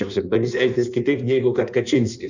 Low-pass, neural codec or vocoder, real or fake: 7.2 kHz; codec, 16 kHz in and 24 kHz out, 1.1 kbps, FireRedTTS-2 codec; fake